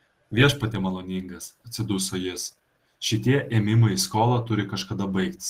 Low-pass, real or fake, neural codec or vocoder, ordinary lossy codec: 14.4 kHz; real; none; Opus, 16 kbps